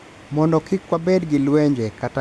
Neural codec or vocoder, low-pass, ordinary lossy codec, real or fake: none; none; none; real